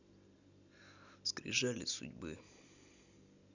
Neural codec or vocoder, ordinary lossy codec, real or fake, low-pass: none; none; real; 7.2 kHz